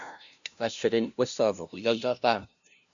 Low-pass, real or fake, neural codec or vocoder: 7.2 kHz; fake; codec, 16 kHz, 0.5 kbps, FunCodec, trained on LibriTTS, 25 frames a second